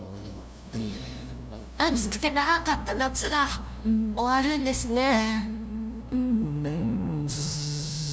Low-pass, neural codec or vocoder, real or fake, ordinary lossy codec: none; codec, 16 kHz, 0.5 kbps, FunCodec, trained on LibriTTS, 25 frames a second; fake; none